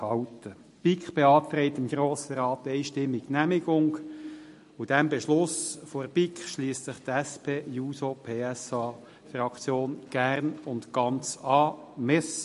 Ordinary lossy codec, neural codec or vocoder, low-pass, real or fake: MP3, 48 kbps; none; 14.4 kHz; real